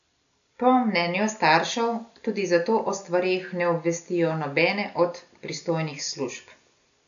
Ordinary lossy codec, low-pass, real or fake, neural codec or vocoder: none; 7.2 kHz; real; none